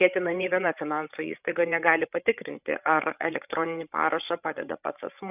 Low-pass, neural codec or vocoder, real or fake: 3.6 kHz; codec, 16 kHz, 8 kbps, FreqCodec, larger model; fake